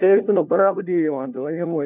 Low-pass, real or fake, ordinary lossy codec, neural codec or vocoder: 3.6 kHz; fake; none; codec, 16 kHz, 1 kbps, FunCodec, trained on LibriTTS, 50 frames a second